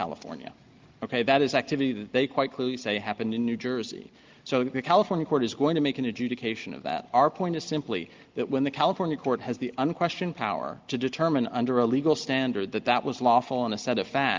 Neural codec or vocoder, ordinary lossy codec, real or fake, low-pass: none; Opus, 32 kbps; real; 7.2 kHz